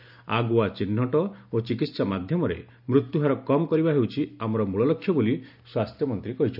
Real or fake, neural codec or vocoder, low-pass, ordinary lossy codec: real; none; 5.4 kHz; none